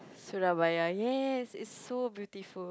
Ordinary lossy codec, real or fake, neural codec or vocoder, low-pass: none; real; none; none